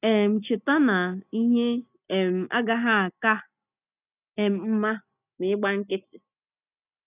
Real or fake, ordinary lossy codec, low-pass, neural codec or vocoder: real; none; 3.6 kHz; none